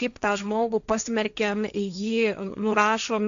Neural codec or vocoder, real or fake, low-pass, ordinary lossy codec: codec, 16 kHz, 1.1 kbps, Voila-Tokenizer; fake; 7.2 kHz; AAC, 96 kbps